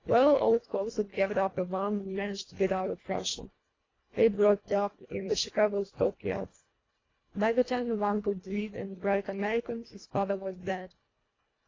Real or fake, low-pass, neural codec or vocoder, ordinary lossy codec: fake; 7.2 kHz; codec, 24 kHz, 1.5 kbps, HILCodec; AAC, 32 kbps